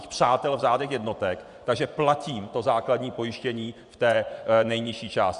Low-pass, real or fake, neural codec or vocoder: 10.8 kHz; fake; vocoder, 24 kHz, 100 mel bands, Vocos